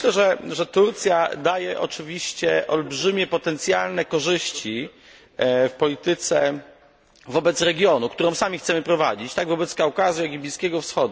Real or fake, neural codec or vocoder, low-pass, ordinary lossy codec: real; none; none; none